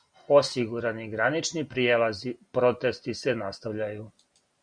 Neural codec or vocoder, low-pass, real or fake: none; 9.9 kHz; real